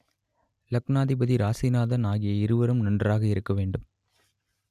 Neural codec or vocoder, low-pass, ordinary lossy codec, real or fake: none; 14.4 kHz; none; real